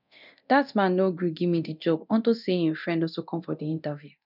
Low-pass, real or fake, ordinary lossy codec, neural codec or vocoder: 5.4 kHz; fake; none; codec, 24 kHz, 0.9 kbps, DualCodec